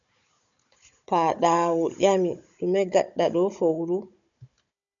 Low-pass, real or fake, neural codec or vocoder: 7.2 kHz; fake; codec, 16 kHz, 16 kbps, FunCodec, trained on Chinese and English, 50 frames a second